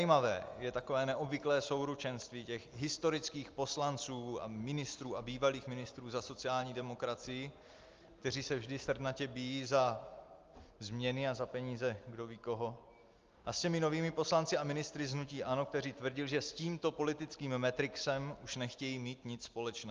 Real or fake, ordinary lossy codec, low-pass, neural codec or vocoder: real; Opus, 32 kbps; 7.2 kHz; none